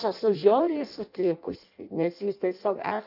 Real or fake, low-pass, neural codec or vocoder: fake; 5.4 kHz; codec, 16 kHz in and 24 kHz out, 0.6 kbps, FireRedTTS-2 codec